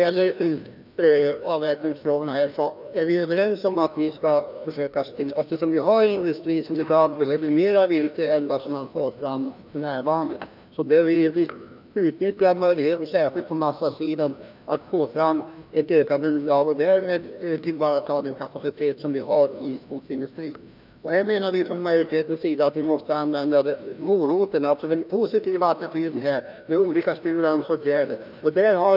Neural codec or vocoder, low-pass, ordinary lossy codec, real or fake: codec, 16 kHz, 1 kbps, FreqCodec, larger model; 5.4 kHz; none; fake